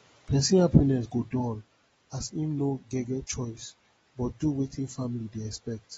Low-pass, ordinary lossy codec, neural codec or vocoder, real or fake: 10.8 kHz; AAC, 24 kbps; none; real